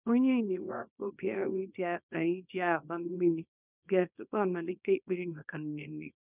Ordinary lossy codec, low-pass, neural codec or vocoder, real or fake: none; 3.6 kHz; codec, 24 kHz, 0.9 kbps, WavTokenizer, small release; fake